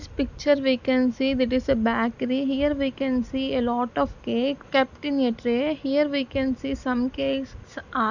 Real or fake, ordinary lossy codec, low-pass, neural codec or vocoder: real; none; 7.2 kHz; none